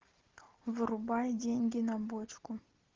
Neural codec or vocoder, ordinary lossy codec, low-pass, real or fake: none; Opus, 16 kbps; 7.2 kHz; real